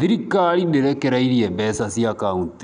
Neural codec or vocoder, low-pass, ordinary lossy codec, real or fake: none; 9.9 kHz; none; real